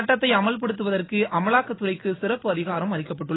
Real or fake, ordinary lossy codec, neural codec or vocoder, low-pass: real; AAC, 16 kbps; none; 7.2 kHz